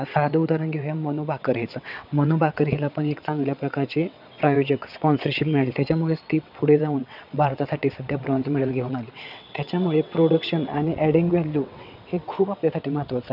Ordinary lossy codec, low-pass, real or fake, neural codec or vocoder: none; 5.4 kHz; fake; vocoder, 22.05 kHz, 80 mel bands, WaveNeXt